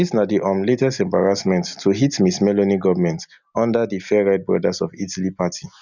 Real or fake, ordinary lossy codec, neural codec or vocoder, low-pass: real; none; none; 7.2 kHz